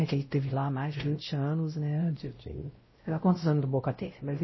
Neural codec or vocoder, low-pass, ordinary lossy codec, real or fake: codec, 16 kHz, 0.5 kbps, X-Codec, WavLM features, trained on Multilingual LibriSpeech; 7.2 kHz; MP3, 24 kbps; fake